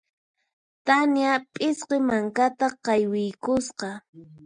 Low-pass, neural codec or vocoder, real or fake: 9.9 kHz; none; real